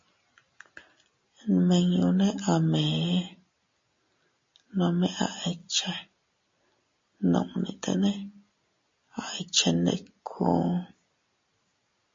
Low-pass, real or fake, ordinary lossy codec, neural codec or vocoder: 7.2 kHz; real; MP3, 32 kbps; none